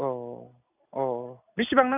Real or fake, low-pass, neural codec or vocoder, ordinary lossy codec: real; 3.6 kHz; none; none